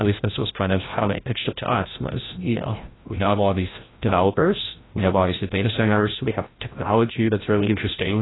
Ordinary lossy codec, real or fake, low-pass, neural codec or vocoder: AAC, 16 kbps; fake; 7.2 kHz; codec, 16 kHz, 0.5 kbps, FreqCodec, larger model